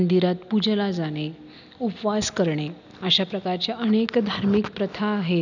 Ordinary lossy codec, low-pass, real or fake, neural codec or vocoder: none; 7.2 kHz; real; none